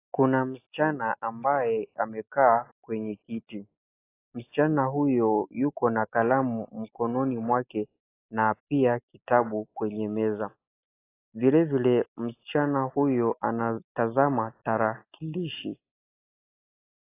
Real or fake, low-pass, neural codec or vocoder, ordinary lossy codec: real; 3.6 kHz; none; AAC, 24 kbps